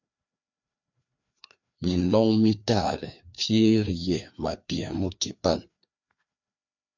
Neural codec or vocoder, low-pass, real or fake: codec, 16 kHz, 2 kbps, FreqCodec, larger model; 7.2 kHz; fake